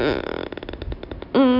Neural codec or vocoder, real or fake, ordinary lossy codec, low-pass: none; real; none; 5.4 kHz